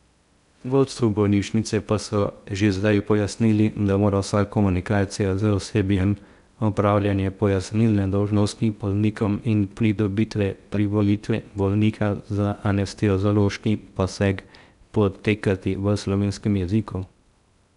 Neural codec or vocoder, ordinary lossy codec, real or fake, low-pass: codec, 16 kHz in and 24 kHz out, 0.6 kbps, FocalCodec, streaming, 2048 codes; none; fake; 10.8 kHz